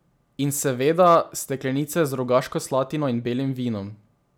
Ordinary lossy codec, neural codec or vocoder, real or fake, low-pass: none; none; real; none